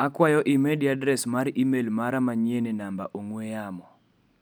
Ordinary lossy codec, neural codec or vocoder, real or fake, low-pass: none; none; real; 19.8 kHz